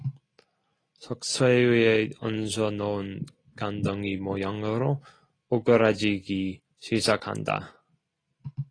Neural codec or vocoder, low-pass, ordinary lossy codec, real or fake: none; 9.9 kHz; AAC, 32 kbps; real